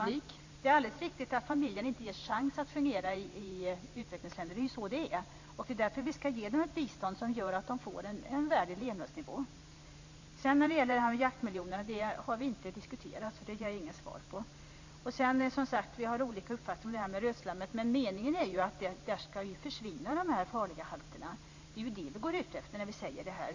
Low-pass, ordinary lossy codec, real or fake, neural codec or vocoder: 7.2 kHz; AAC, 48 kbps; fake; vocoder, 44.1 kHz, 128 mel bands every 512 samples, BigVGAN v2